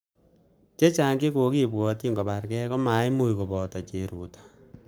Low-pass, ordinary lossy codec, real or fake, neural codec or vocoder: none; none; fake; codec, 44.1 kHz, 7.8 kbps, Pupu-Codec